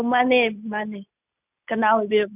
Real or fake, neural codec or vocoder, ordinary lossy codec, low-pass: real; none; none; 3.6 kHz